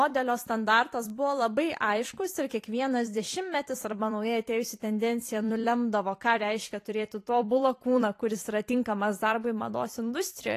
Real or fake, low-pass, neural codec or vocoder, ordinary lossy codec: fake; 14.4 kHz; vocoder, 44.1 kHz, 128 mel bands every 256 samples, BigVGAN v2; AAC, 48 kbps